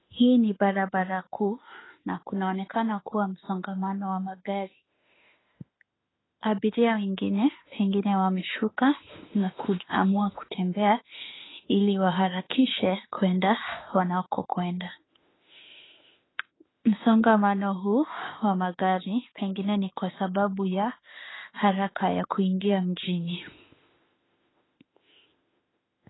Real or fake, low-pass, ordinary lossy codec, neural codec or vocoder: fake; 7.2 kHz; AAC, 16 kbps; autoencoder, 48 kHz, 32 numbers a frame, DAC-VAE, trained on Japanese speech